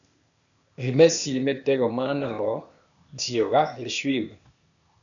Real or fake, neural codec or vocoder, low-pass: fake; codec, 16 kHz, 0.8 kbps, ZipCodec; 7.2 kHz